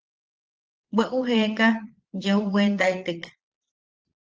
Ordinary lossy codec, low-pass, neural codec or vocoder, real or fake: Opus, 16 kbps; 7.2 kHz; vocoder, 22.05 kHz, 80 mel bands, Vocos; fake